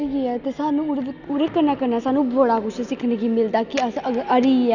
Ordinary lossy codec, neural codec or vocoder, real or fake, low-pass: none; none; real; 7.2 kHz